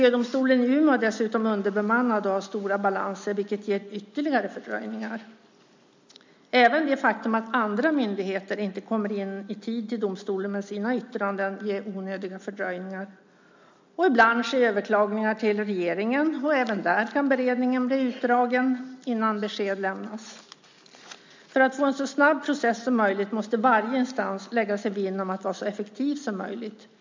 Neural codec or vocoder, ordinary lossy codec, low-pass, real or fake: none; MP3, 64 kbps; 7.2 kHz; real